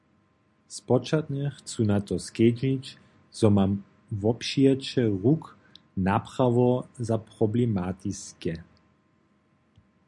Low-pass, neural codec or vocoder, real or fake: 10.8 kHz; none; real